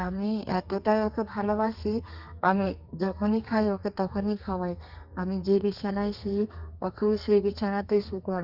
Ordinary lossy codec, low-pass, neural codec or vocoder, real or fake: Opus, 64 kbps; 5.4 kHz; codec, 32 kHz, 1.9 kbps, SNAC; fake